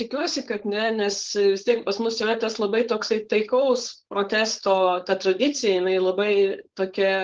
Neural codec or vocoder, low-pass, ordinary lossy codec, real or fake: codec, 16 kHz, 4.8 kbps, FACodec; 7.2 kHz; Opus, 16 kbps; fake